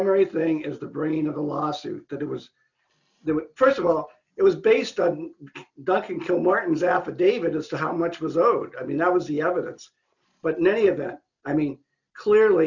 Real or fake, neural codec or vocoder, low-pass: real; none; 7.2 kHz